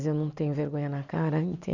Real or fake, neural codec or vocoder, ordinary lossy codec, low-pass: real; none; none; 7.2 kHz